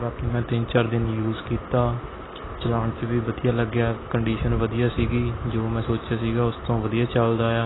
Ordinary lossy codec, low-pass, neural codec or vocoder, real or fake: AAC, 16 kbps; 7.2 kHz; none; real